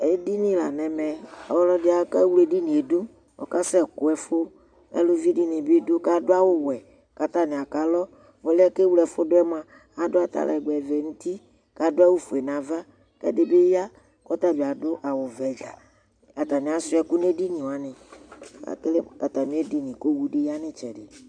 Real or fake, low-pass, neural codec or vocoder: real; 9.9 kHz; none